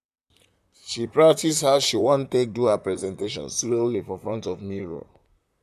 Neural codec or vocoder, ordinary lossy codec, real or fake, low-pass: vocoder, 44.1 kHz, 128 mel bands, Pupu-Vocoder; none; fake; 14.4 kHz